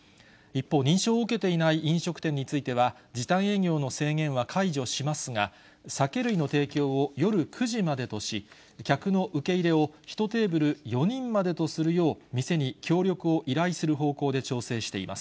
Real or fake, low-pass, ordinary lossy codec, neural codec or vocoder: real; none; none; none